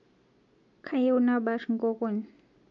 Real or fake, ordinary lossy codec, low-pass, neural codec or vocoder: real; MP3, 48 kbps; 7.2 kHz; none